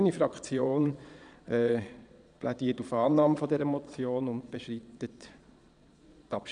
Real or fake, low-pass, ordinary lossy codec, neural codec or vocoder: fake; 9.9 kHz; none; vocoder, 22.05 kHz, 80 mel bands, Vocos